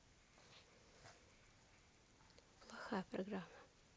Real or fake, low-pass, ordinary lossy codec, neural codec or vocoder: real; none; none; none